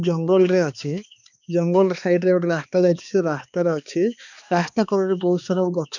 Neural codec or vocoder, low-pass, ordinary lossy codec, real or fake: codec, 16 kHz, 2 kbps, X-Codec, HuBERT features, trained on balanced general audio; 7.2 kHz; none; fake